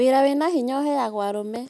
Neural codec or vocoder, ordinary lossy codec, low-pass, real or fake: none; none; none; real